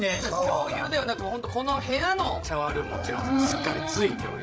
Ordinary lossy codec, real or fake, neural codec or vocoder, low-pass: none; fake; codec, 16 kHz, 8 kbps, FreqCodec, larger model; none